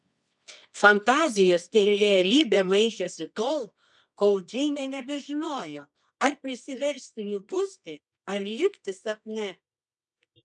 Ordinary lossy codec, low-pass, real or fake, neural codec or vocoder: MP3, 96 kbps; 10.8 kHz; fake; codec, 24 kHz, 0.9 kbps, WavTokenizer, medium music audio release